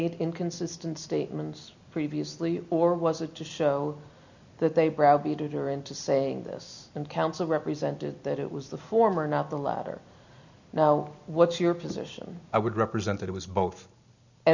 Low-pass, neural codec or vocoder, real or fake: 7.2 kHz; none; real